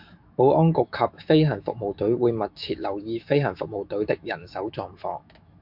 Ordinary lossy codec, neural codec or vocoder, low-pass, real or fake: MP3, 48 kbps; autoencoder, 48 kHz, 128 numbers a frame, DAC-VAE, trained on Japanese speech; 5.4 kHz; fake